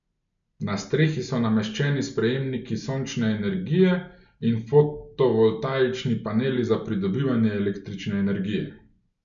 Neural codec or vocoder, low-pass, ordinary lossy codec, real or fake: none; 7.2 kHz; MP3, 96 kbps; real